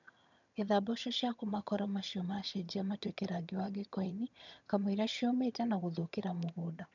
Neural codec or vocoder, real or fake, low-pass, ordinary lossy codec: vocoder, 22.05 kHz, 80 mel bands, HiFi-GAN; fake; 7.2 kHz; none